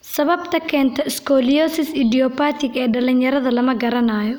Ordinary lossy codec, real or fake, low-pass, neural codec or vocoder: none; real; none; none